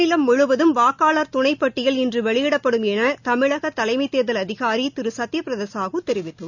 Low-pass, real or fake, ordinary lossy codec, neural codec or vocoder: 7.2 kHz; real; none; none